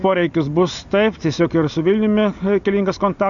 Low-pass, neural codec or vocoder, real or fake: 7.2 kHz; none; real